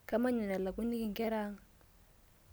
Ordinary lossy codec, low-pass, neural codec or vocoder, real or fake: none; none; none; real